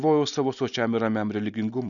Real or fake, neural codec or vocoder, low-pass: real; none; 7.2 kHz